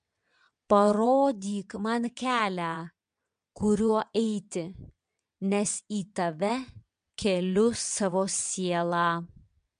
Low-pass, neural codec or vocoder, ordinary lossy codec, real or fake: 9.9 kHz; vocoder, 22.05 kHz, 80 mel bands, WaveNeXt; MP3, 64 kbps; fake